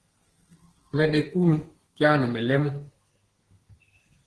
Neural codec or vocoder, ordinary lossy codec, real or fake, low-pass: codec, 32 kHz, 1.9 kbps, SNAC; Opus, 16 kbps; fake; 10.8 kHz